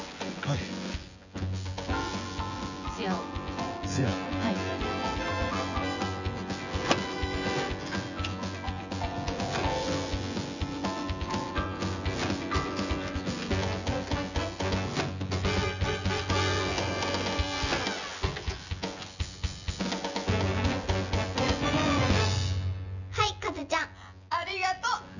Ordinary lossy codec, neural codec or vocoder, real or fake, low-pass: none; vocoder, 24 kHz, 100 mel bands, Vocos; fake; 7.2 kHz